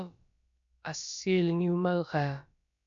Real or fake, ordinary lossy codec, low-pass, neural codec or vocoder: fake; AAC, 64 kbps; 7.2 kHz; codec, 16 kHz, about 1 kbps, DyCAST, with the encoder's durations